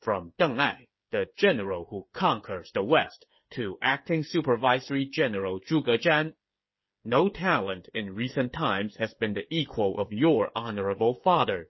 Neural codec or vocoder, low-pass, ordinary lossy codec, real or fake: codec, 16 kHz, 8 kbps, FreqCodec, smaller model; 7.2 kHz; MP3, 24 kbps; fake